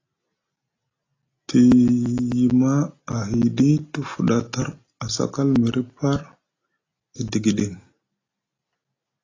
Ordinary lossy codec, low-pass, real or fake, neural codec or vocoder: AAC, 32 kbps; 7.2 kHz; real; none